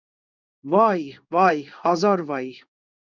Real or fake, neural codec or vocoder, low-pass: fake; codec, 16 kHz in and 24 kHz out, 1 kbps, XY-Tokenizer; 7.2 kHz